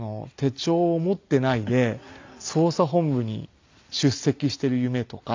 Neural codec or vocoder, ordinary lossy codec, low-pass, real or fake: none; MP3, 64 kbps; 7.2 kHz; real